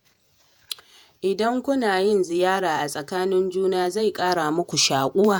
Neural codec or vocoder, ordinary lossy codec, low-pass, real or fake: vocoder, 48 kHz, 128 mel bands, Vocos; none; none; fake